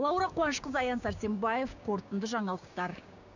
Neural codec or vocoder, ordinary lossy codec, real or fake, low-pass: codec, 16 kHz, 6 kbps, DAC; none; fake; 7.2 kHz